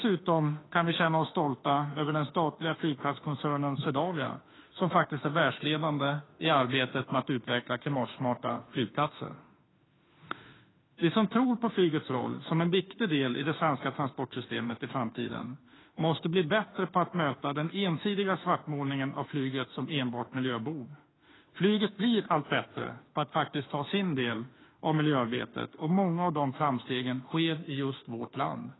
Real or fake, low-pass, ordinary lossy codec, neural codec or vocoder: fake; 7.2 kHz; AAC, 16 kbps; autoencoder, 48 kHz, 32 numbers a frame, DAC-VAE, trained on Japanese speech